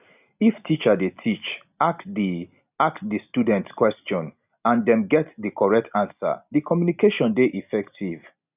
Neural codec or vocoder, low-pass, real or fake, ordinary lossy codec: none; 3.6 kHz; real; none